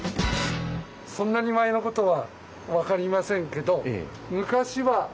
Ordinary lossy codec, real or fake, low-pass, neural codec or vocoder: none; real; none; none